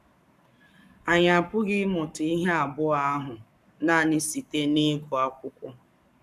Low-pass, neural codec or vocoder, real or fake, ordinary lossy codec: 14.4 kHz; codec, 44.1 kHz, 7.8 kbps, Pupu-Codec; fake; none